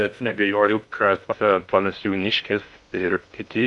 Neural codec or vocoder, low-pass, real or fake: codec, 16 kHz in and 24 kHz out, 0.6 kbps, FocalCodec, streaming, 2048 codes; 10.8 kHz; fake